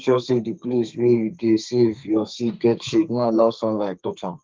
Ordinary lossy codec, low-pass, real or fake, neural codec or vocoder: Opus, 32 kbps; 7.2 kHz; fake; codec, 32 kHz, 1.9 kbps, SNAC